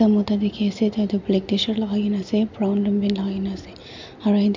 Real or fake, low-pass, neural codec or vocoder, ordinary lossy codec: real; 7.2 kHz; none; MP3, 64 kbps